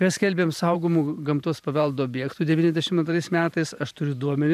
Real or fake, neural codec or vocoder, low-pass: fake; vocoder, 44.1 kHz, 128 mel bands every 512 samples, BigVGAN v2; 14.4 kHz